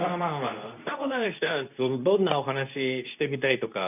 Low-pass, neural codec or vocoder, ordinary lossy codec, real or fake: 3.6 kHz; codec, 24 kHz, 0.9 kbps, WavTokenizer, medium speech release version 2; none; fake